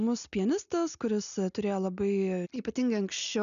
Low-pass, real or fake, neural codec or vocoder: 7.2 kHz; real; none